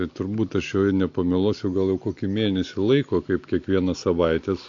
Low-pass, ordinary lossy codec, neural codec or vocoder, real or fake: 7.2 kHz; AAC, 48 kbps; none; real